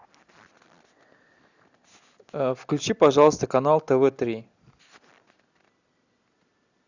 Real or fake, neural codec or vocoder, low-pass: real; none; 7.2 kHz